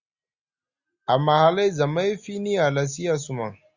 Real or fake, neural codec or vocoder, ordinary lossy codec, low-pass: real; none; Opus, 64 kbps; 7.2 kHz